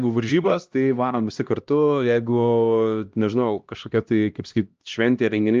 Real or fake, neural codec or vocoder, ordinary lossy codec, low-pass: fake; codec, 16 kHz, 1 kbps, X-Codec, HuBERT features, trained on LibriSpeech; Opus, 24 kbps; 7.2 kHz